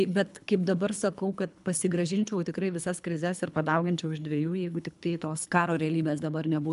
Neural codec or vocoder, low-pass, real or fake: codec, 24 kHz, 3 kbps, HILCodec; 10.8 kHz; fake